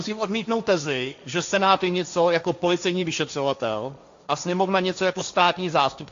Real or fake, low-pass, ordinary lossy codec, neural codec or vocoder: fake; 7.2 kHz; AAC, 64 kbps; codec, 16 kHz, 1.1 kbps, Voila-Tokenizer